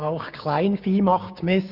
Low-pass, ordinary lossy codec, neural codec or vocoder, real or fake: 5.4 kHz; none; codec, 16 kHz, 6 kbps, DAC; fake